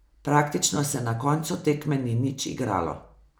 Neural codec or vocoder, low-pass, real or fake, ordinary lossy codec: none; none; real; none